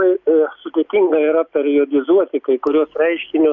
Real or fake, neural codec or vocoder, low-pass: real; none; 7.2 kHz